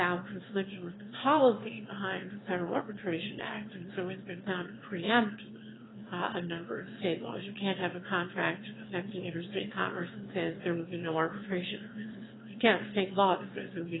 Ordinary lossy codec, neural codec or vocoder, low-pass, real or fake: AAC, 16 kbps; autoencoder, 22.05 kHz, a latent of 192 numbers a frame, VITS, trained on one speaker; 7.2 kHz; fake